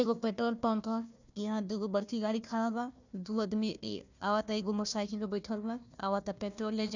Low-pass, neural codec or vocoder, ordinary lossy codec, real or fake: 7.2 kHz; codec, 16 kHz, 1 kbps, FunCodec, trained on Chinese and English, 50 frames a second; none; fake